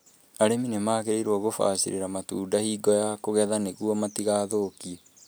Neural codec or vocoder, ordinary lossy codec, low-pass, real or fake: none; none; none; real